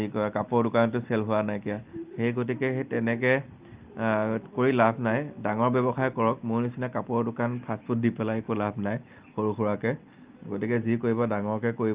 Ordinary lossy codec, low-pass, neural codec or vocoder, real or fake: Opus, 32 kbps; 3.6 kHz; none; real